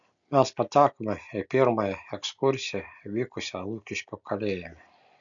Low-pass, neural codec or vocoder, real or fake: 7.2 kHz; none; real